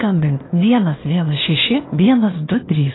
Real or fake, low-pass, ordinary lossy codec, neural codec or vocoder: fake; 7.2 kHz; AAC, 16 kbps; codec, 16 kHz, 0.8 kbps, ZipCodec